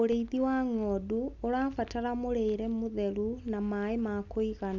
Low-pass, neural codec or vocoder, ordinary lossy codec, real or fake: 7.2 kHz; none; none; real